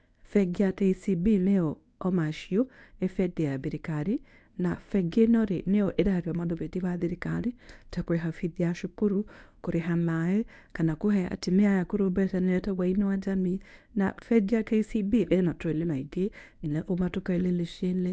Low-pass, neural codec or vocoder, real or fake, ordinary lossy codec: 9.9 kHz; codec, 24 kHz, 0.9 kbps, WavTokenizer, medium speech release version 1; fake; none